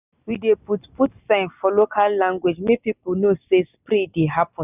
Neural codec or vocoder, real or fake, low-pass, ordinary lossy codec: none; real; 3.6 kHz; none